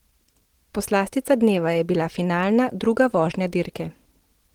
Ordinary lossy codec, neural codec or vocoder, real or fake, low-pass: Opus, 16 kbps; none; real; 19.8 kHz